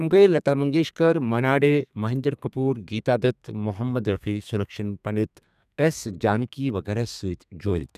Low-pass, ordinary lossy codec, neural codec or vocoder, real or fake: 14.4 kHz; none; codec, 32 kHz, 1.9 kbps, SNAC; fake